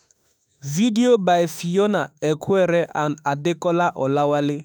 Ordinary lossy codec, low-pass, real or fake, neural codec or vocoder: none; none; fake; autoencoder, 48 kHz, 32 numbers a frame, DAC-VAE, trained on Japanese speech